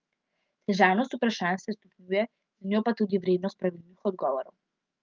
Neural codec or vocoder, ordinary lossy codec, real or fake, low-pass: autoencoder, 48 kHz, 128 numbers a frame, DAC-VAE, trained on Japanese speech; Opus, 24 kbps; fake; 7.2 kHz